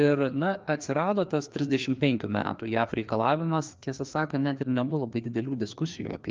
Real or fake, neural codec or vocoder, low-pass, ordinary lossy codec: fake; codec, 16 kHz, 2 kbps, FreqCodec, larger model; 7.2 kHz; Opus, 24 kbps